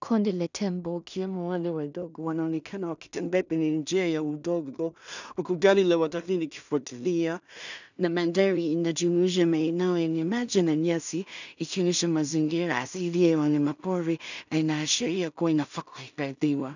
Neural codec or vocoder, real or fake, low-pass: codec, 16 kHz in and 24 kHz out, 0.4 kbps, LongCat-Audio-Codec, two codebook decoder; fake; 7.2 kHz